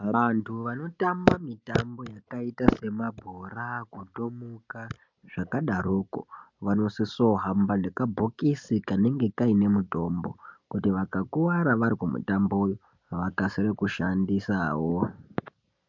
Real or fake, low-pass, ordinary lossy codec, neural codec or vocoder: real; 7.2 kHz; MP3, 64 kbps; none